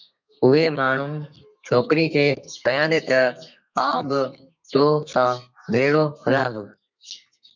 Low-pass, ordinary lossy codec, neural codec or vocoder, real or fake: 7.2 kHz; MP3, 64 kbps; codec, 32 kHz, 1.9 kbps, SNAC; fake